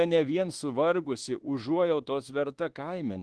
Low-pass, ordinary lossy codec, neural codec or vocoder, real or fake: 10.8 kHz; Opus, 16 kbps; codec, 24 kHz, 1.2 kbps, DualCodec; fake